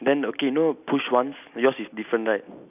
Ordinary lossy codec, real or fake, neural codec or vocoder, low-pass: none; real; none; 3.6 kHz